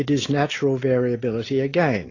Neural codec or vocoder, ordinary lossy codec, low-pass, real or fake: none; AAC, 32 kbps; 7.2 kHz; real